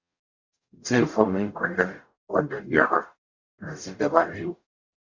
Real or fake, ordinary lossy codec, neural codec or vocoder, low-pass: fake; Opus, 64 kbps; codec, 44.1 kHz, 0.9 kbps, DAC; 7.2 kHz